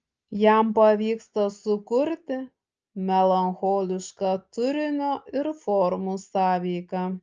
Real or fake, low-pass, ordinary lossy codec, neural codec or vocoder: real; 7.2 kHz; Opus, 24 kbps; none